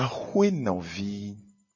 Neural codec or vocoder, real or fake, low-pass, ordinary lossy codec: none; real; 7.2 kHz; MP3, 32 kbps